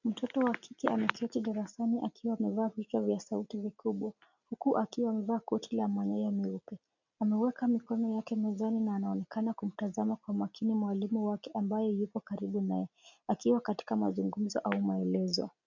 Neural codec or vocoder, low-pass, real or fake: none; 7.2 kHz; real